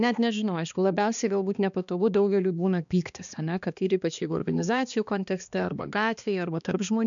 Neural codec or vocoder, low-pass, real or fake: codec, 16 kHz, 2 kbps, X-Codec, HuBERT features, trained on balanced general audio; 7.2 kHz; fake